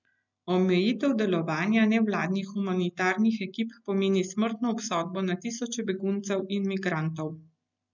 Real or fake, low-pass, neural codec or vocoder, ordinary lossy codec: real; 7.2 kHz; none; none